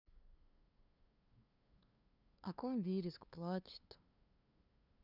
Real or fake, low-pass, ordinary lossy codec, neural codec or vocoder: fake; 5.4 kHz; none; codec, 16 kHz, 2 kbps, FunCodec, trained on LibriTTS, 25 frames a second